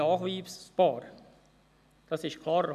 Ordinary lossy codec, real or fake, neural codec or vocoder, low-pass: none; real; none; 14.4 kHz